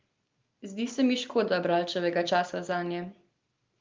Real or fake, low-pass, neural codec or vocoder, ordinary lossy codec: real; 7.2 kHz; none; Opus, 32 kbps